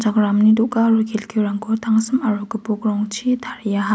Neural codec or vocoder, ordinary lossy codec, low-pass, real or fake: none; none; none; real